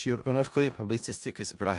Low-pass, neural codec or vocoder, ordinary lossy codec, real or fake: 10.8 kHz; codec, 16 kHz in and 24 kHz out, 0.4 kbps, LongCat-Audio-Codec, four codebook decoder; AAC, 64 kbps; fake